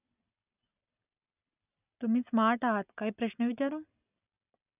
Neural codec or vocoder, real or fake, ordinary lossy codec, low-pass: none; real; none; 3.6 kHz